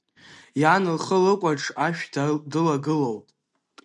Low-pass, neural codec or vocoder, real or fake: 10.8 kHz; none; real